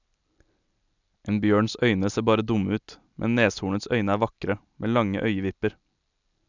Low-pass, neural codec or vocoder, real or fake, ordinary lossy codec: 7.2 kHz; none; real; none